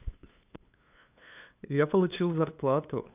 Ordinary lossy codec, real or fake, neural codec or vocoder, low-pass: none; fake; codec, 16 kHz, 2 kbps, FunCodec, trained on LibriTTS, 25 frames a second; 3.6 kHz